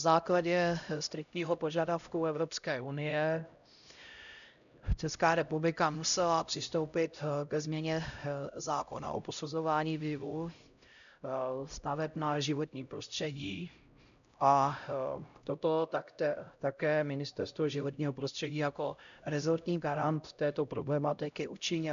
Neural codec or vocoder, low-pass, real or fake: codec, 16 kHz, 0.5 kbps, X-Codec, HuBERT features, trained on LibriSpeech; 7.2 kHz; fake